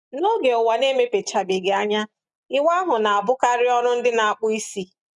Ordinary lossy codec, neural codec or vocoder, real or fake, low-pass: none; none; real; 10.8 kHz